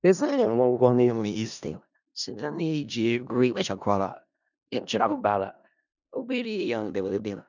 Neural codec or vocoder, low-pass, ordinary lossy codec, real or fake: codec, 16 kHz in and 24 kHz out, 0.4 kbps, LongCat-Audio-Codec, four codebook decoder; 7.2 kHz; none; fake